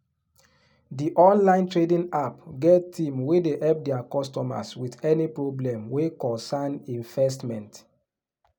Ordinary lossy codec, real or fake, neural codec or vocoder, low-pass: none; real; none; 19.8 kHz